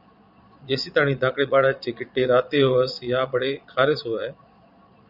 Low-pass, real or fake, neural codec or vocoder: 5.4 kHz; fake; vocoder, 22.05 kHz, 80 mel bands, Vocos